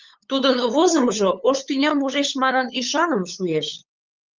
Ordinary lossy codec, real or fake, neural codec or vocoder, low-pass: Opus, 32 kbps; fake; codec, 16 kHz, 8 kbps, FunCodec, trained on LibriTTS, 25 frames a second; 7.2 kHz